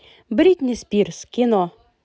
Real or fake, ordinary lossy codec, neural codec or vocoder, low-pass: real; none; none; none